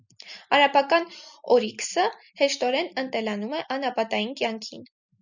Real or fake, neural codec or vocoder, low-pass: real; none; 7.2 kHz